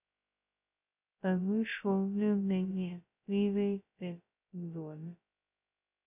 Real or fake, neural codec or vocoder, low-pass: fake; codec, 16 kHz, 0.2 kbps, FocalCodec; 3.6 kHz